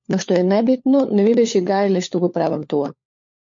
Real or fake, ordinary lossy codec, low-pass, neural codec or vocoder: fake; MP3, 48 kbps; 7.2 kHz; codec, 16 kHz, 4 kbps, FunCodec, trained on LibriTTS, 50 frames a second